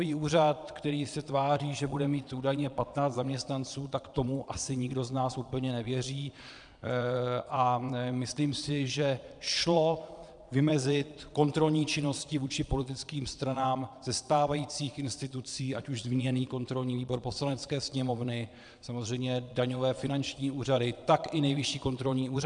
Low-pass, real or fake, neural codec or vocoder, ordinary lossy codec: 9.9 kHz; fake; vocoder, 22.05 kHz, 80 mel bands, Vocos; MP3, 96 kbps